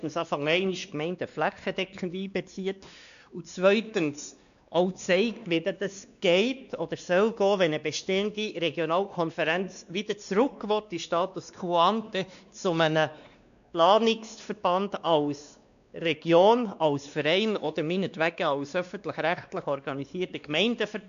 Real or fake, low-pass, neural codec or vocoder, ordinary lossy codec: fake; 7.2 kHz; codec, 16 kHz, 2 kbps, X-Codec, WavLM features, trained on Multilingual LibriSpeech; none